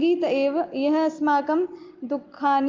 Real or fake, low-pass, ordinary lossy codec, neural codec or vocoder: real; 7.2 kHz; Opus, 32 kbps; none